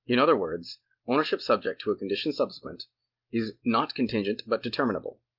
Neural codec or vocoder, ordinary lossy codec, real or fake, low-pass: none; Opus, 24 kbps; real; 5.4 kHz